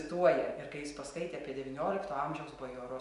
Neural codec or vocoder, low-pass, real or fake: none; 10.8 kHz; real